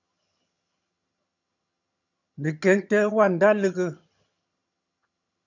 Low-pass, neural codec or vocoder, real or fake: 7.2 kHz; vocoder, 22.05 kHz, 80 mel bands, HiFi-GAN; fake